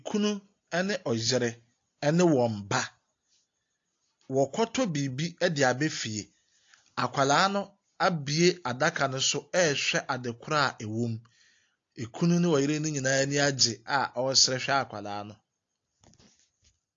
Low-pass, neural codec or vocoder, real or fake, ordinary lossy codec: 7.2 kHz; none; real; AAC, 48 kbps